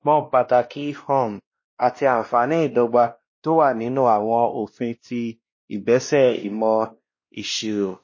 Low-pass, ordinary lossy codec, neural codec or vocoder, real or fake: 7.2 kHz; MP3, 32 kbps; codec, 16 kHz, 1 kbps, X-Codec, WavLM features, trained on Multilingual LibriSpeech; fake